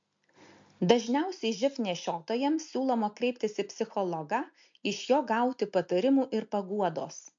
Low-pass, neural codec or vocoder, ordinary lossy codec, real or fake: 7.2 kHz; none; MP3, 48 kbps; real